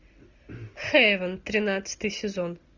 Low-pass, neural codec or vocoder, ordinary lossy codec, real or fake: 7.2 kHz; none; Opus, 64 kbps; real